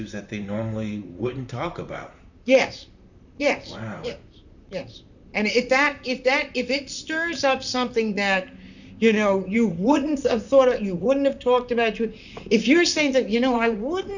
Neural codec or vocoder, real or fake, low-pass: vocoder, 44.1 kHz, 128 mel bands, Pupu-Vocoder; fake; 7.2 kHz